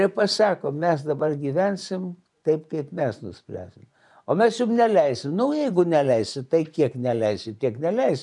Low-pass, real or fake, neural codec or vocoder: 10.8 kHz; fake; vocoder, 24 kHz, 100 mel bands, Vocos